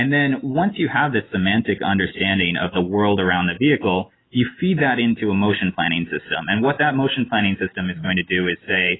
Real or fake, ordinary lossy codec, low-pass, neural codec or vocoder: real; AAC, 16 kbps; 7.2 kHz; none